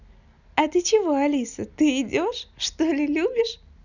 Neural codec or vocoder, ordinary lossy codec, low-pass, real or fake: none; none; 7.2 kHz; real